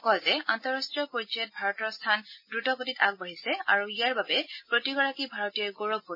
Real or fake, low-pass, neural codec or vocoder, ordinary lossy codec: real; 5.4 kHz; none; MP3, 24 kbps